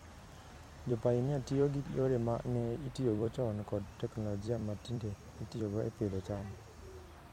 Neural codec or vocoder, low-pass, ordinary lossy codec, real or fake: vocoder, 44.1 kHz, 128 mel bands every 256 samples, BigVGAN v2; 19.8 kHz; MP3, 64 kbps; fake